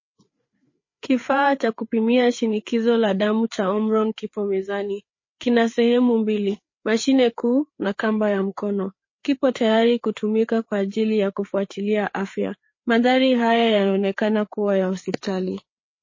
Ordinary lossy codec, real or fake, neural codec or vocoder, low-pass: MP3, 32 kbps; fake; codec, 16 kHz, 4 kbps, FreqCodec, larger model; 7.2 kHz